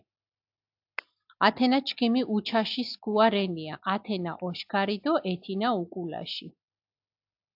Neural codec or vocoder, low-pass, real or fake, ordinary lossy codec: none; 5.4 kHz; real; AAC, 48 kbps